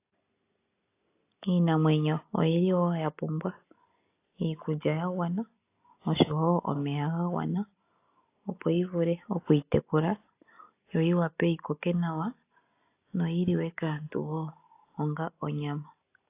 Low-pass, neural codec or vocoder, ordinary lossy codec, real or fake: 3.6 kHz; none; AAC, 24 kbps; real